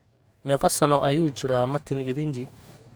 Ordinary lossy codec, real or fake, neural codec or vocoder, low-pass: none; fake; codec, 44.1 kHz, 2.6 kbps, DAC; none